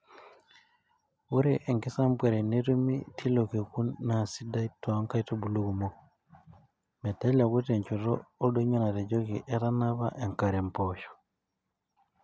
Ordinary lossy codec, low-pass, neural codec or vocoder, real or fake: none; none; none; real